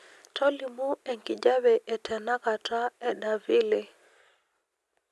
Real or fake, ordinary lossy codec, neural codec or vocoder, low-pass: real; none; none; none